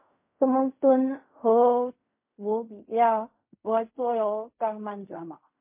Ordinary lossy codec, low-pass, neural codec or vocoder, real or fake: MP3, 24 kbps; 3.6 kHz; codec, 16 kHz in and 24 kHz out, 0.4 kbps, LongCat-Audio-Codec, fine tuned four codebook decoder; fake